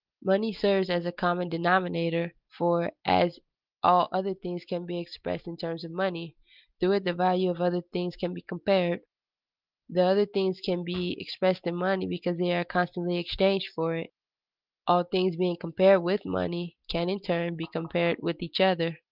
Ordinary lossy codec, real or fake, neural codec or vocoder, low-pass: Opus, 24 kbps; real; none; 5.4 kHz